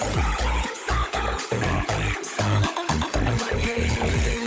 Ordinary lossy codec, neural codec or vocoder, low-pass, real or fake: none; codec, 16 kHz, 16 kbps, FunCodec, trained on LibriTTS, 50 frames a second; none; fake